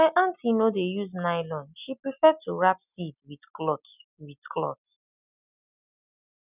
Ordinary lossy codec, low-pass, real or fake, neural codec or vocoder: none; 3.6 kHz; real; none